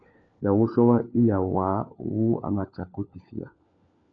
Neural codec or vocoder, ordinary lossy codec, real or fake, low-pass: codec, 16 kHz, 4 kbps, FunCodec, trained on LibriTTS, 50 frames a second; MP3, 64 kbps; fake; 7.2 kHz